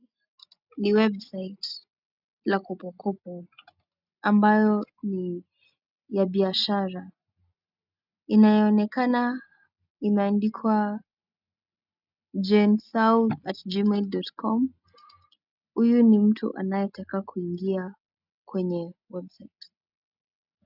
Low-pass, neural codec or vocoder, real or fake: 5.4 kHz; none; real